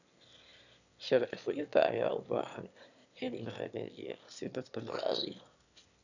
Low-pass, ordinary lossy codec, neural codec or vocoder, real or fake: 7.2 kHz; none; autoencoder, 22.05 kHz, a latent of 192 numbers a frame, VITS, trained on one speaker; fake